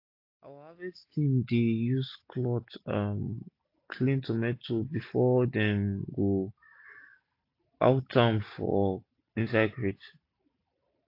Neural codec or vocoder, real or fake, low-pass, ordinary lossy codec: none; real; 5.4 kHz; AAC, 32 kbps